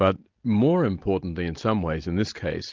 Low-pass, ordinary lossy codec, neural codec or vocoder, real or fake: 7.2 kHz; Opus, 24 kbps; none; real